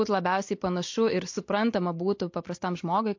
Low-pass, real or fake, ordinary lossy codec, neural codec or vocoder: 7.2 kHz; real; MP3, 48 kbps; none